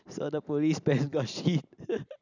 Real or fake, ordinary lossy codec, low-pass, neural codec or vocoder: real; none; 7.2 kHz; none